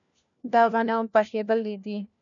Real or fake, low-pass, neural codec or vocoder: fake; 7.2 kHz; codec, 16 kHz, 1 kbps, FunCodec, trained on LibriTTS, 50 frames a second